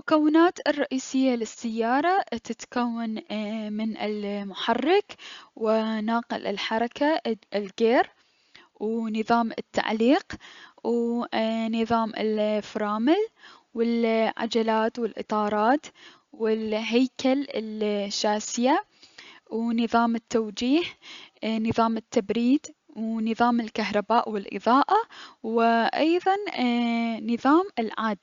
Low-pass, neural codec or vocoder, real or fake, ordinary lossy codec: 7.2 kHz; none; real; Opus, 64 kbps